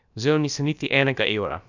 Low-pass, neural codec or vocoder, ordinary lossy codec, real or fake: 7.2 kHz; codec, 16 kHz, about 1 kbps, DyCAST, with the encoder's durations; none; fake